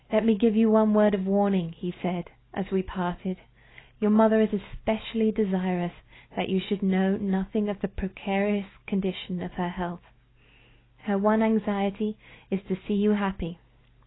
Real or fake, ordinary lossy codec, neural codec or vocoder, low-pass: real; AAC, 16 kbps; none; 7.2 kHz